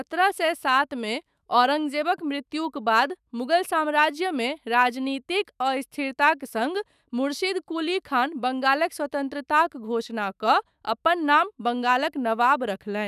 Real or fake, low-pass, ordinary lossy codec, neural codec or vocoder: fake; 14.4 kHz; none; autoencoder, 48 kHz, 128 numbers a frame, DAC-VAE, trained on Japanese speech